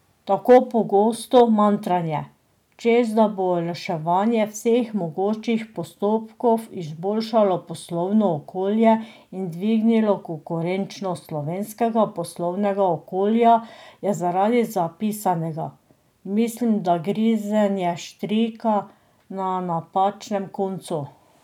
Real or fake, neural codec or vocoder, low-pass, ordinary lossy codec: real; none; 19.8 kHz; none